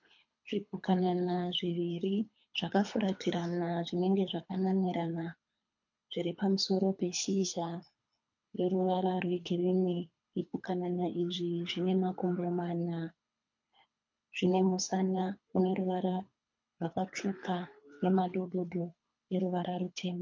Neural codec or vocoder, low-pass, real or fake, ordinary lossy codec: codec, 24 kHz, 3 kbps, HILCodec; 7.2 kHz; fake; MP3, 48 kbps